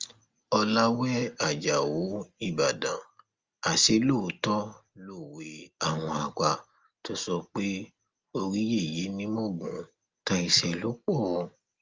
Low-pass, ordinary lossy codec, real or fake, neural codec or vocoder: 7.2 kHz; Opus, 32 kbps; real; none